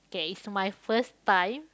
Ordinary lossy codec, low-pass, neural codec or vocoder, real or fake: none; none; none; real